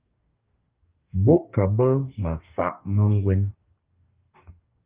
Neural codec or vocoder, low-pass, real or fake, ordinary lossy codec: codec, 44.1 kHz, 2.6 kbps, DAC; 3.6 kHz; fake; Opus, 32 kbps